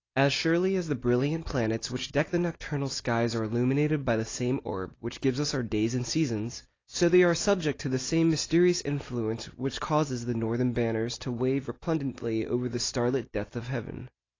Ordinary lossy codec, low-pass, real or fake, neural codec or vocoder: AAC, 32 kbps; 7.2 kHz; real; none